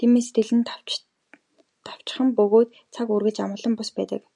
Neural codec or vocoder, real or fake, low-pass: none; real; 10.8 kHz